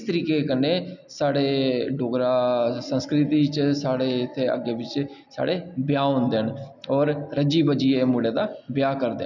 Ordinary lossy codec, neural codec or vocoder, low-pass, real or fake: none; none; 7.2 kHz; real